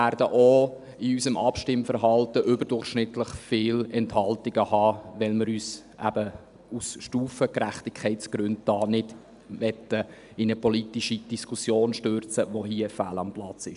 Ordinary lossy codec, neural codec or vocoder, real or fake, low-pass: none; none; real; 10.8 kHz